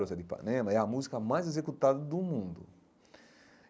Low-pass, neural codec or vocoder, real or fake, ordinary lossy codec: none; none; real; none